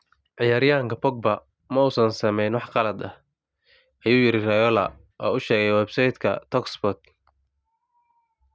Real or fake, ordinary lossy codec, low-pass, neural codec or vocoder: real; none; none; none